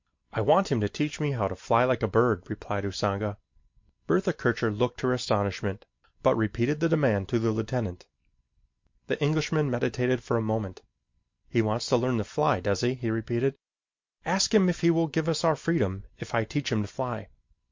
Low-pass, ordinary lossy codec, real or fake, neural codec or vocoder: 7.2 kHz; MP3, 48 kbps; real; none